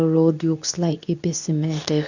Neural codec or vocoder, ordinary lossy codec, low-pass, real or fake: codec, 24 kHz, 0.9 kbps, WavTokenizer, medium speech release version 2; none; 7.2 kHz; fake